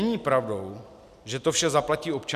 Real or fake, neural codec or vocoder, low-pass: real; none; 14.4 kHz